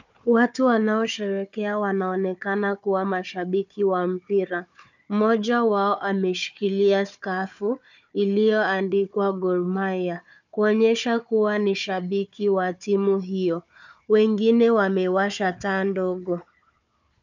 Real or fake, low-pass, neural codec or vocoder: fake; 7.2 kHz; codec, 16 kHz, 4 kbps, FunCodec, trained on Chinese and English, 50 frames a second